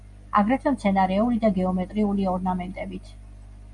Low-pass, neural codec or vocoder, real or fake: 10.8 kHz; none; real